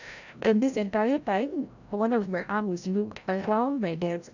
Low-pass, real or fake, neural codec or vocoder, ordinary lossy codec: 7.2 kHz; fake; codec, 16 kHz, 0.5 kbps, FreqCodec, larger model; none